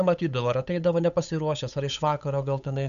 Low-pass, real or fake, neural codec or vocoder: 7.2 kHz; fake; codec, 16 kHz, 8 kbps, FunCodec, trained on Chinese and English, 25 frames a second